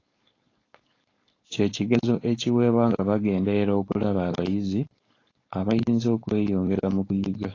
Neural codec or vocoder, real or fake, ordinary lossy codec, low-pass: codec, 16 kHz, 4.8 kbps, FACodec; fake; AAC, 32 kbps; 7.2 kHz